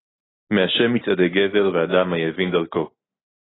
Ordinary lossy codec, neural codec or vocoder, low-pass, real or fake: AAC, 16 kbps; none; 7.2 kHz; real